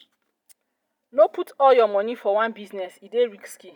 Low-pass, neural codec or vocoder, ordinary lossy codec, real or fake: 19.8 kHz; none; none; real